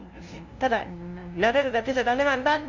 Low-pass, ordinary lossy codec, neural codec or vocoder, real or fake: 7.2 kHz; Opus, 64 kbps; codec, 16 kHz, 0.5 kbps, FunCodec, trained on LibriTTS, 25 frames a second; fake